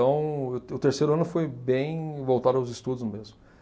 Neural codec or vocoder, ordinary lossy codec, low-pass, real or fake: none; none; none; real